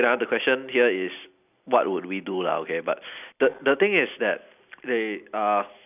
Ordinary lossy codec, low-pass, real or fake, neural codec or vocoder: none; 3.6 kHz; real; none